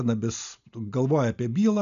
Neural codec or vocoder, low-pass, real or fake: none; 7.2 kHz; real